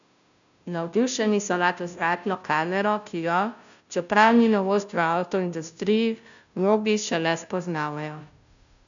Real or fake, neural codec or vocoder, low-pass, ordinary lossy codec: fake; codec, 16 kHz, 0.5 kbps, FunCodec, trained on Chinese and English, 25 frames a second; 7.2 kHz; none